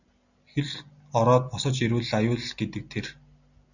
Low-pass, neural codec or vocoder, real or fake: 7.2 kHz; none; real